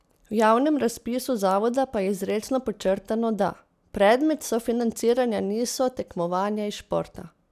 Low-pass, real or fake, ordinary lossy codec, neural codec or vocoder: 14.4 kHz; real; none; none